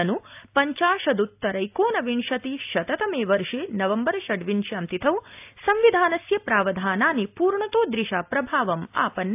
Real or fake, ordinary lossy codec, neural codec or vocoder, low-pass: real; AAC, 32 kbps; none; 3.6 kHz